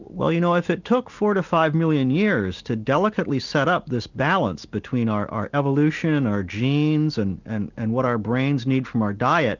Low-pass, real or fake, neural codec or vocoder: 7.2 kHz; real; none